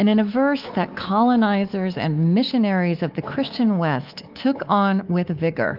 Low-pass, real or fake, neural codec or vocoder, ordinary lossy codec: 5.4 kHz; fake; codec, 24 kHz, 3.1 kbps, DualCodec; Opus, 32 kbps